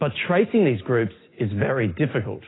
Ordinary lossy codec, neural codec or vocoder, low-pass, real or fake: AAC, 16 kbps; none; 7.2 kHz; real